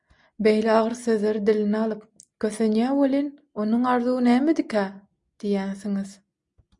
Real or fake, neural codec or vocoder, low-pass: real; none; 10.8 kHz